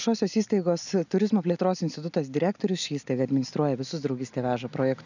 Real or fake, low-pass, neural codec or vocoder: real; 7.2 kHz; none